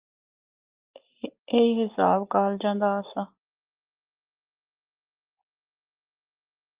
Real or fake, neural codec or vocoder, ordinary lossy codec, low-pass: real; none; Opus, 32 kbps; 3.6 kHz